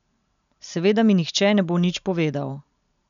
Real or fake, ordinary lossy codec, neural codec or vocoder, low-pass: real; none; none; 7.2 kHz